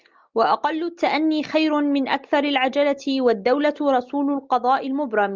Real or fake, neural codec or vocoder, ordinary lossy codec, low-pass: real; none; Opus, 24 kbps; 7.2 kHz